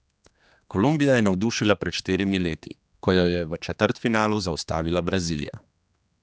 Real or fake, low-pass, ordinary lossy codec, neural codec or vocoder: fake; none; none; codec, 16 kHz, 2 kbps, X-Codec, HuBERT features, trained on general audio